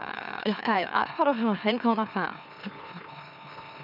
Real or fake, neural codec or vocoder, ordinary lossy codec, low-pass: fake; autoencoder, 44.1 kHz, a latent of 192 numbers a frame, MeloTTS; none; 5.4 kHz